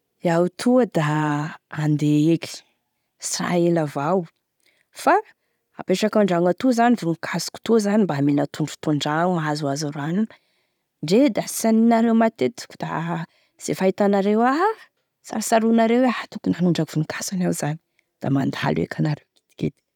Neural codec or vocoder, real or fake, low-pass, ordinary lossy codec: none; real; 19.8 kHz; none